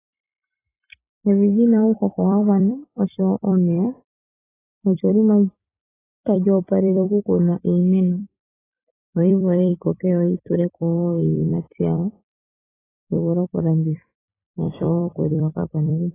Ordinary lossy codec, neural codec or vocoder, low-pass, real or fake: AAC, 16 kbps; vocoder, 44.1 kHz, 128 mel bands every 256 samples, BigVGAN v2; 3.6 kHz; fake